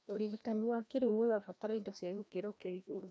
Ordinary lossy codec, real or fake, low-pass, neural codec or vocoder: none; fake; none; codec, 16 kHz, 1 kbps, FreqCodec, larger model